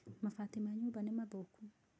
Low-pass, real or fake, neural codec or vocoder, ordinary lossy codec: none; real; none; none